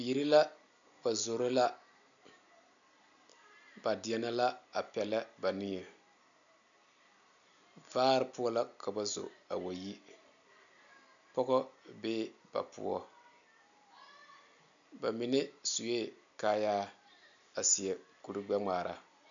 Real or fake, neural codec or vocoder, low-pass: real; none; 7.2 kHz